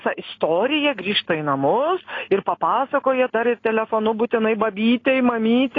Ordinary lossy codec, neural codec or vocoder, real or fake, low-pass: AAC, 32 kbps; none; real; 7.2 kHz